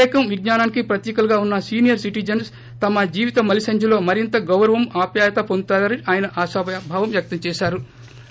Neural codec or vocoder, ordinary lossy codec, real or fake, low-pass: none; none; real; none